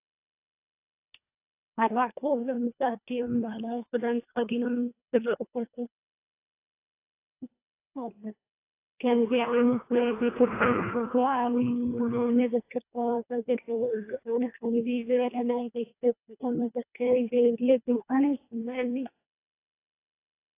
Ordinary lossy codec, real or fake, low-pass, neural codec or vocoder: AAC, 24 kbps; fake; 3.6 kHz; codec, 24 kHz, 1.5 kbps, HILCodec